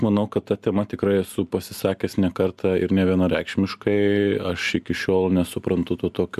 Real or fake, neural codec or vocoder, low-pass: real; none; 14.4 kHz